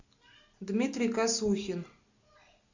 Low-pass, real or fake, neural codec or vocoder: 7.2 kHz; real; none